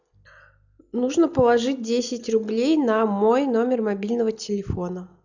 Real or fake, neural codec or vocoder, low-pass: real; none; 7.2 kHz